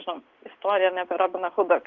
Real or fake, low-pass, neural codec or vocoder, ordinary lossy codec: real; 7.2 kHz; none; Opus, 24 kbps